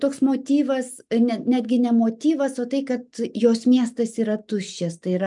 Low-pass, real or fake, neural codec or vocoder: 10.8 kHz; real; none